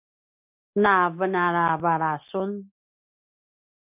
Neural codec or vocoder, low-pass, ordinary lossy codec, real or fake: none; 3.6 kHz; MP3, 32 kbps; real